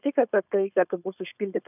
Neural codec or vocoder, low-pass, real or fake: codec, 16 kHz, 4.8 kbps, FACodec; 3.6 kHz; fake